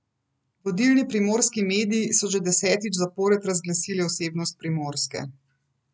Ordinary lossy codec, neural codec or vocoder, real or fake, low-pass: none; none; real; none